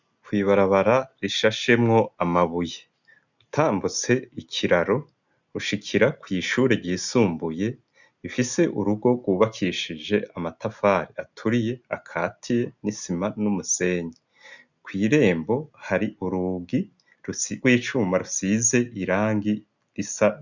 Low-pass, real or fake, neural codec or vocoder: 7.2 kHz; real; none